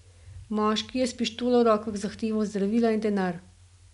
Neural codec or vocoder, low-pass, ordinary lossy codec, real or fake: none; 10.8 kHz; none; real